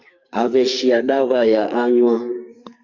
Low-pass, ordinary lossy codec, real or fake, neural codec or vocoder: 7.2 kHz; Opus, 64 kbps; fake; codec, 44.1 kHz, 2.6 kbps, SNAC